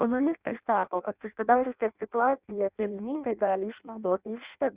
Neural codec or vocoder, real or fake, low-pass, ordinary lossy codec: codec, 16 kHz in and 24 kHz out, 0.6 kbps, FireRedTTS-2 codec; fake; 3.6 kHz; Opus, 64 kbps